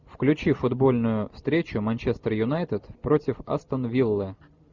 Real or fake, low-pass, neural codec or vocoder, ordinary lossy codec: real; 7.2 kHz; none; Opus, 64 kbps